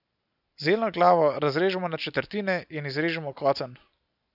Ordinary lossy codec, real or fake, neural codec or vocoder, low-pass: none; real; none; 5.4 kHz